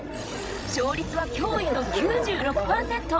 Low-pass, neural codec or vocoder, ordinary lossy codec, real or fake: none; codec, 16 kHz, 16 kbps, FreqCodec, larger model; none; fake